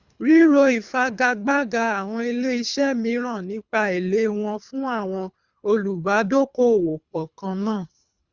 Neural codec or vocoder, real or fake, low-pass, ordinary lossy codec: codec, 24 kHz, 3 kbps, HILCodec; fake; 7.2 kHz; Opus, 64 kbps